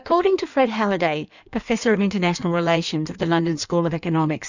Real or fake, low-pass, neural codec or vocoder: fake; 7.2 kHz; codec, 16 kHz in and 24 kHz out, 1.1 kbps, FireRedTTS-2 codec